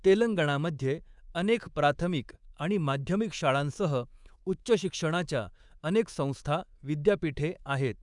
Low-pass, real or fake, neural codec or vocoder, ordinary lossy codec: 10.8 kHz; fake; codec, 24 kHz, 3.1 kbps, DualCodec; Opus, 64 kbps